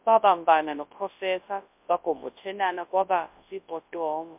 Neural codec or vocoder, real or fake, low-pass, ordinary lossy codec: codec, 24 kHz, 0.9 kbps, WavTokenizer, large speech release; fake; 3.6 kHz; MP3, 32 kbps